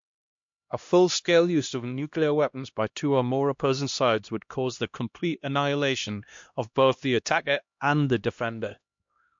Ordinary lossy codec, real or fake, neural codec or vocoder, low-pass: MP3, 48 kbps; fake; codec, 16 kHz, 1 kbps, X-Codec, HuBERT features, trained on LibriSpeech; 7.2 kHz